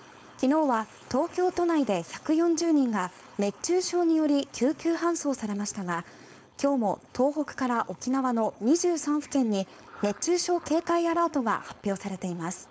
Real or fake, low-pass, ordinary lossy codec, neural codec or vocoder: fake; none; none; codec, 16 kHz, 4.8 kbps, FACodec